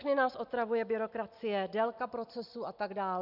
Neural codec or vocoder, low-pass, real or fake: none; 5.4 kHz; real